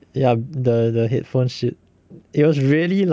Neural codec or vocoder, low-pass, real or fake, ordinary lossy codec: none; none; real; none